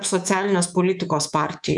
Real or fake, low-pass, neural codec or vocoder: fake; 10.8 kHz; codec, 24 kHz, 3.1 kbps, DualCodec